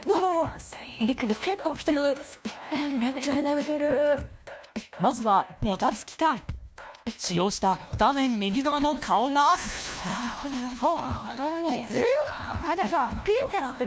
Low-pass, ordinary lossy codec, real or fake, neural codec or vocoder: none; none; fake; codec, 16 kHz, 1 kbps, FunCodec, trained on LibriTTS, 50 frames a second